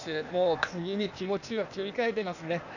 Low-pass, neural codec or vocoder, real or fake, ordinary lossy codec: 7.2 kHz; codec, 16 kHz, 0.8 kbps, ZipCodec; fake; none